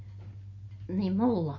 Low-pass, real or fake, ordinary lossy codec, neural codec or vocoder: 7.2 kHz; real; MP3, 32 kbps; none